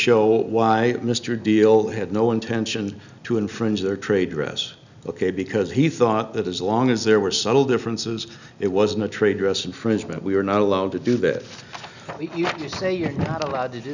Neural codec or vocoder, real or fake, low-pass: none; real; 7.2 kHz